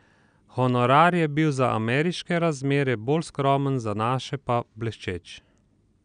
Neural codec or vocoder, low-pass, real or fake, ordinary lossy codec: none; 9.9 kHz; real; none